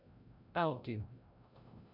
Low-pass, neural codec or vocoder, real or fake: 5.4 kHz; codec, 16 kHz, 0.5 kbps, FreqCodec, larger model; fake